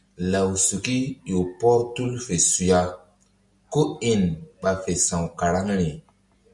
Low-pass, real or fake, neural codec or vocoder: 10.8 kHz; real; none